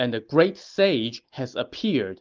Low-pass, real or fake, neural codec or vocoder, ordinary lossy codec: 7.2 kHz; real; none; Opus, 24 kbps